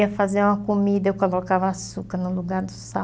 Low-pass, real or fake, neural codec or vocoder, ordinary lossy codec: none; real; none; none